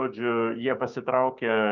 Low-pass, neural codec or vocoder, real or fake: 7.2 kHz; codec, 16 kHz, 6 kbps, DAC; fake